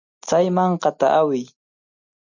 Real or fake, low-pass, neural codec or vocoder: real; 7.2 kHz; none